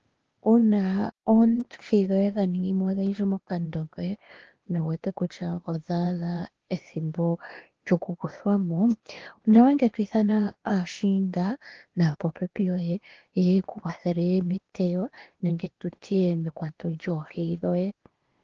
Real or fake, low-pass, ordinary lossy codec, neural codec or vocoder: fake; 7.2 kHz; Opus, 16 kbps; codec, 16 kHz, 0.8 kbps, ZipCodec